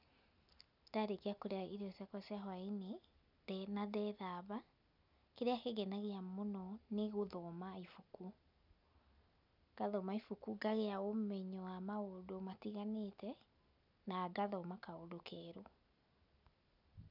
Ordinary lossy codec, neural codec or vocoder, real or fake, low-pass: none; none; real; 5.4 kHz